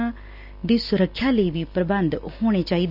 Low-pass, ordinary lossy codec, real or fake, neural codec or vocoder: 5.4 kHz; none; real; none